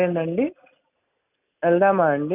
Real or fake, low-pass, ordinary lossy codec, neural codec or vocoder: real; 3.6 kHz; none; none